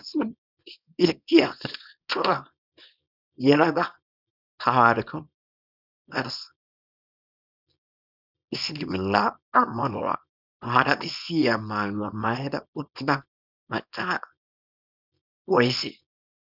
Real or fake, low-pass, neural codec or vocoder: fake; 5.4 kHz; codec, 24 kHz, 0.9 kbps, WavTokenizer, small release